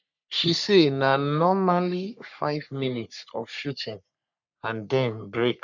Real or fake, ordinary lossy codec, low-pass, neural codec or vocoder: fake; none; 7.2 kHz; codec, 44.1 kHz, 3.4 kbps, Pupu-Codec